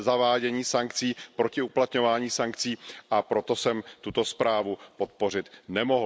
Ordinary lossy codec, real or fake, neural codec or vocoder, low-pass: none; real; none; none